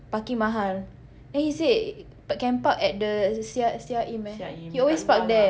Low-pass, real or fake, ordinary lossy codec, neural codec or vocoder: none; real; none; none